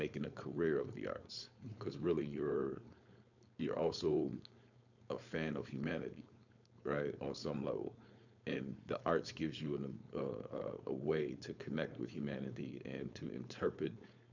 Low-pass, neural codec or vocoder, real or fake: 7.2 kHz; codec, 16 kHz, 4.8 kbps, FACodec; fake